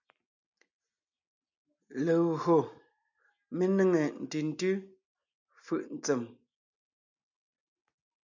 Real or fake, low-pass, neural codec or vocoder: real; 7.2 kHz; none